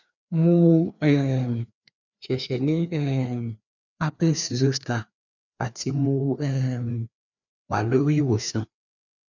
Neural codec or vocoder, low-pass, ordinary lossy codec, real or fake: codec, 16 kHz, 2 kbps, FreqCodec, larger model; 7.2 kHz; none; fake